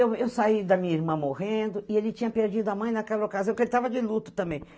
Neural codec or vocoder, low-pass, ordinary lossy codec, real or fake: none; none; none; real